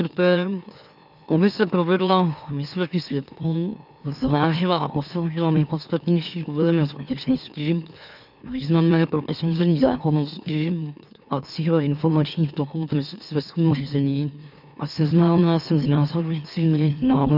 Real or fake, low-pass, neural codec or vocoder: fake; 5.4 kHz; autoencoder, 44.1 kHz, a latent of 192 numbers a frame, MeloTTS